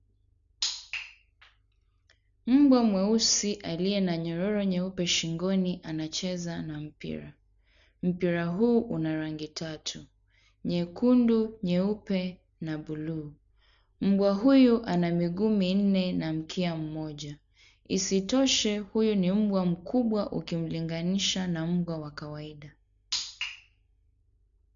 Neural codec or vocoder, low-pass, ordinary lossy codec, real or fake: none; 7.2 kHz; none; real